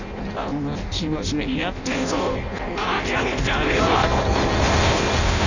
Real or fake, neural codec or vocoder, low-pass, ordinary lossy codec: fake; codec, 16 kHz in and 24 kHz out, 0.6 kbps, FireRedTTS-2 codec; 7.2 kHz; none